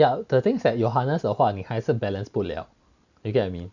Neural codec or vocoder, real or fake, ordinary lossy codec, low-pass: none; real; none; 7.2 kHz